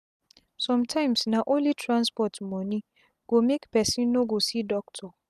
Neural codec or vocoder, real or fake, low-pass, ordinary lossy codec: none; real; 14.4 kHz; none